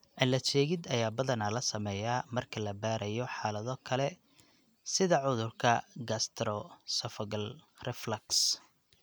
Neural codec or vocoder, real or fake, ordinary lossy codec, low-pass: none; real; none; none